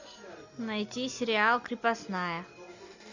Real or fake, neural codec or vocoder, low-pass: real; none; 7.2 kHz